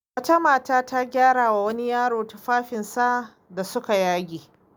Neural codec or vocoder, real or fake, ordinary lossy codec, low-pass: none; real; none; none